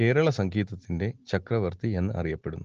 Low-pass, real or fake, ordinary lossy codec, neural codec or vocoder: 7.2 kHz; real; Opus, 16 kbps; none